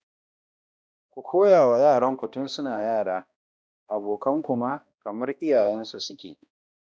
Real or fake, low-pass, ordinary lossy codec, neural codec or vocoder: fake; none; none; codec, 16 kHz, 1 kbps, X-Codec, HuBERT features, trained on balanced general audio